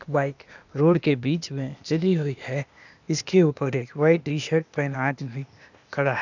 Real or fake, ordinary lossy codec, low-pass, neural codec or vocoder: fake; none; 7.2 kHz; codec, 16 kHz, 0.8 kbps, ZipCodec